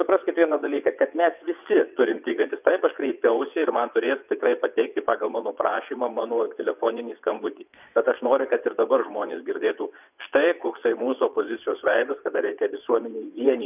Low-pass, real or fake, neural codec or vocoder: 3.6 kHz; fake; vocoder, 22.05 kHz, 80 mel bands, WaveNeXt